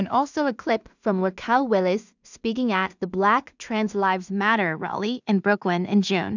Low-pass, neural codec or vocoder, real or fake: 7.2 kHz; codec, 16 kHz in and 24 kHz out, 0.4 kbps, LongCat-Audio-Codec, two codebook decoder; fake